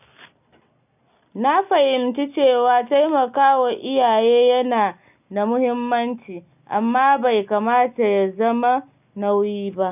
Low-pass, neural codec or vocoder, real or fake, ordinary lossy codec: 3.6 kHz; none; real; none